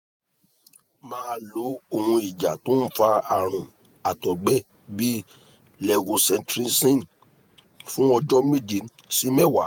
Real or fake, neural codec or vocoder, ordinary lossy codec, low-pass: fake; vocoder, 48 kHz, 128 mel bands, Vocos; none; none